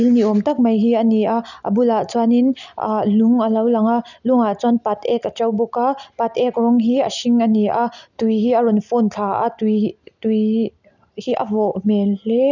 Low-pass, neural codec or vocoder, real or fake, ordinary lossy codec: 7.2 kHz; none; real; none